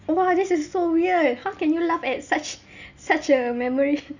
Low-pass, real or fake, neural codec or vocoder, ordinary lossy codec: 7.2 kHz; real; none; none